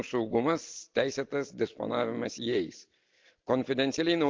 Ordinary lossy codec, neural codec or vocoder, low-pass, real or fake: Opus, 24 kbps; none; 7.2 kHz; real